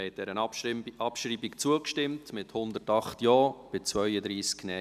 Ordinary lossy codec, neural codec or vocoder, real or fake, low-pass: none; none; real; 14.4 kHz